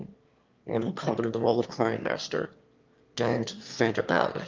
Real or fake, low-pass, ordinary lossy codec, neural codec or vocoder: fake; 7.2 kHz; Opus, 24 kbps; autoencoder, 22.05 kHz, a latent of 192 numbers a frame, VITS, trained on one speaker